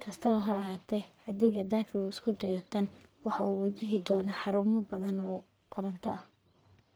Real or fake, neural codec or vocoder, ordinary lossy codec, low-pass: fake; codec, 44.1 kHz, 1.7 kbps, Pupu-Codec; none; none